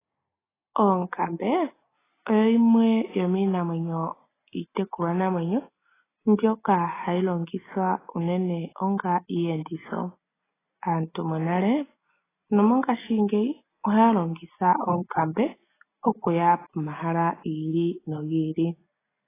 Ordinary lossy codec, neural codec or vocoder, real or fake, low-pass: AAC, 16 kbps; none; real; 3.6 kHz